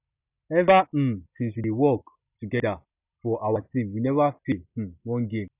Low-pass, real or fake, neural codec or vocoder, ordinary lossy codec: 3.6 kHz; real; none; none